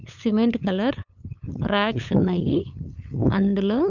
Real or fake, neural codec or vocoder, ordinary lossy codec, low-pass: fake; codec, 16 kHz, 8 kbps, FunCodec, trained on LibriTTS, 25 frames a second; none; 7.2 kHz